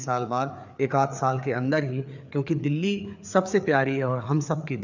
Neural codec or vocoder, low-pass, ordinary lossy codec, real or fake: codec, 16 kHz, 4 kbps, FreqCodec, larger model; 7.2 kHz; none; fake